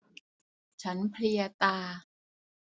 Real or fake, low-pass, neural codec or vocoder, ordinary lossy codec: real; none; none; none